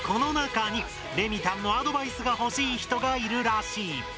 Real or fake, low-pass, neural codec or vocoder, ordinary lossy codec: real; none; none; none